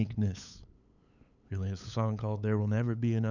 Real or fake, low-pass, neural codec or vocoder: fake; 7.2 kHz; codec, 16 kHz, 8 kbps, FunCodec, trained on LibriTTS, 25 frames a second